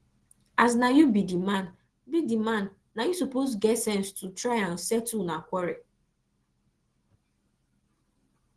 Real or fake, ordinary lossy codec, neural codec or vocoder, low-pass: real; Opus, 16 kbps; none; 10.8 kHz